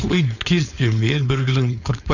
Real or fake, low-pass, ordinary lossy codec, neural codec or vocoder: fake; 7.2 kHz; none; codec, 16 kHz, 4.8 kbps, FACodec